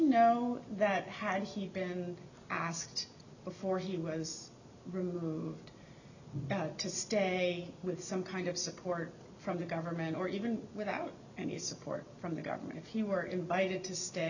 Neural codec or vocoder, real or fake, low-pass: none; real; 7.2 kHz